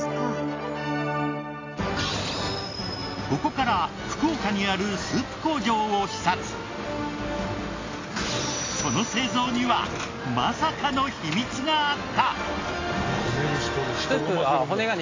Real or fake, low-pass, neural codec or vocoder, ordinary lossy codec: real; 7.2 kHz; none; AAC, 32 kbps